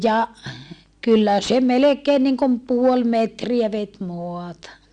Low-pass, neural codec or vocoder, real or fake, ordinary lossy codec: 9.9 kHz; none; real; Opus, 64 kbps